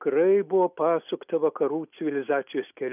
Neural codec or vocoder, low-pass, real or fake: none; 3.6 kHz; real